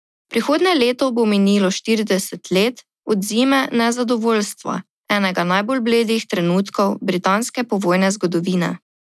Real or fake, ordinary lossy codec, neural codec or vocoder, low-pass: real; none; none; none